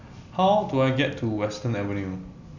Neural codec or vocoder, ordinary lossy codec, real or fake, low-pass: none; none; real; 7.2 kHz